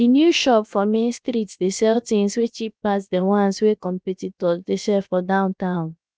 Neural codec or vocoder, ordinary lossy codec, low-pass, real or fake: codec, 16 kHz, 0.7 kbps, FocalCodec; none; none; fake